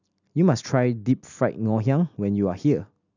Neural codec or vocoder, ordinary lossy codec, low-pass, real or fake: none; none; 7.2 kHz; real